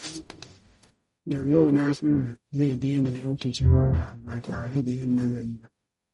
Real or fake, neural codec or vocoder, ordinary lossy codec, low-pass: fake; codec, 44.1 kHz, 0.9 kbps, DAC; MP3, 48 kbps; 19.8 kHz